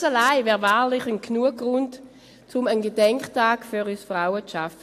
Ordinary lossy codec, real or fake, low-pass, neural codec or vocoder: AAC, 64 kbps; real; 14.4 kHz; none